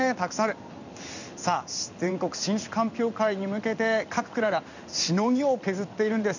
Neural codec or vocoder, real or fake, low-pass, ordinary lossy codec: codec, 16 kHz in and 24 kHz out, 1 kbps, XY-Tokenizer; fake; 7.2 kHz; none